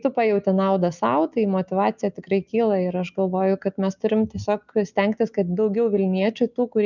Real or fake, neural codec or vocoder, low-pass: real; none; 7.2 kHz